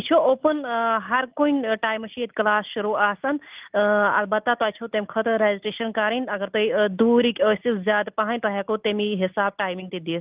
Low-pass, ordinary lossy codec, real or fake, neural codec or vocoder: 3.6 kHz; Opus, 24 kbps; real; none